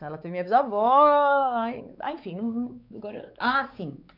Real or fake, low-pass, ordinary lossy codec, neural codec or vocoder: fake; 5.4 kHz; none; codec, 16 kHz, 4 kbps, X-Codec, WavLM features, trained on Multilingual LibriSpeech